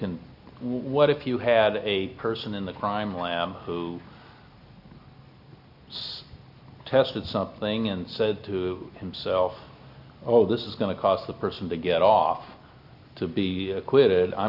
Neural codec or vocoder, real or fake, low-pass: none; real; 5.4 kHz